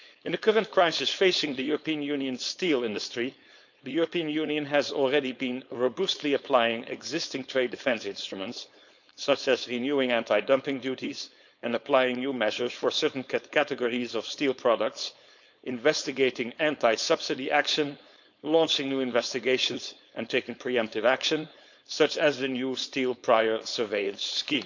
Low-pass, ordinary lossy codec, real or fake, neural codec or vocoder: 7.2 kHz; none; fake; codec, 16 kHz, 4.8 kbps, FACodec